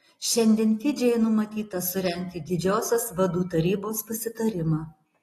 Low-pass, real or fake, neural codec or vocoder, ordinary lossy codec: 19.8 kHz; real; none; AAC, 32 kbps